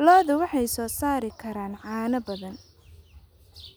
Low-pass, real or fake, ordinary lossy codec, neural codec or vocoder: none; real; none; none